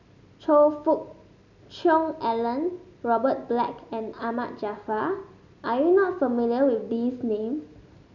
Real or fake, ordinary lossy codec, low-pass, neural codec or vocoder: real; none; 7.2 kHz; none